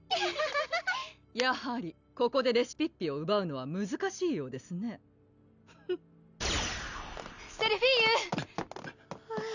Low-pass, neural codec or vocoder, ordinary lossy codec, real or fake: 7.2 kHz; none; none; real